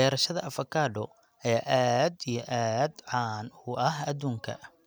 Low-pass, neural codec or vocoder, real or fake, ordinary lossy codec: none; none; real; none